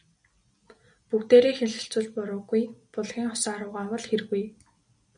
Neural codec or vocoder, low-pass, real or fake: none; 9.9 kHz; real